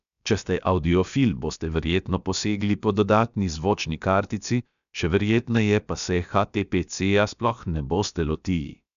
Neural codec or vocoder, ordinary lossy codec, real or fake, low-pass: codec, 16 kHz, about 1 kbps, DyCAST, with the encoder's durations; none; fake; 7.2 kHz